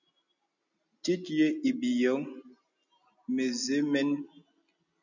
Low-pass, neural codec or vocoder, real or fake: 7.2 kHz; none; real